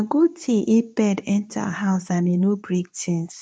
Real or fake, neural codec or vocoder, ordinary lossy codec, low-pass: fake; codec, 24 kHz, 0.9 kbps, WavTokenizer, medium speech release version 2; none; 9.9 kHz